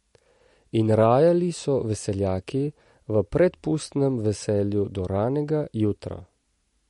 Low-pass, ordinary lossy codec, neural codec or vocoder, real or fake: 19.8 kHz; MP3, 48 kbps; none; real